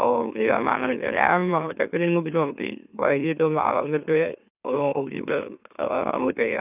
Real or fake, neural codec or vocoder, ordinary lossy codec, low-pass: fake; autoencoder, 44.1 kHz, a latent of 192 numbers a frame, MeloTTS; none; 3.6 kHz